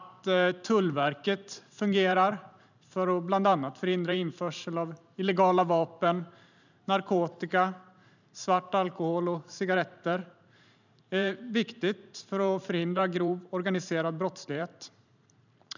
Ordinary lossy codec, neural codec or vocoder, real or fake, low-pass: none; vocoder, 44.1 kHz, 128 mel bands every 512 samples, BigVGAN v2; fake; 7.2 kHz